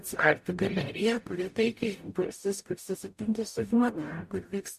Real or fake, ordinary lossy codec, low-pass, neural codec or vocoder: fake; MP3, 64 kbps; 14.4 kHz; codec, 44.1 kHz, 0.9 kbps, DAC